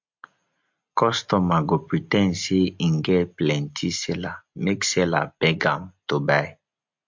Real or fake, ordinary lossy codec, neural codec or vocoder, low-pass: real; MP3, 48 kbps; none; 7.2 kHz